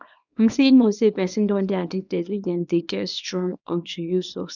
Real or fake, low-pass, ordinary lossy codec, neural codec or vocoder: fake; 7.2 kHz; none; codec, 24 kHz, 0.9 kbps, WavTokenizer, small release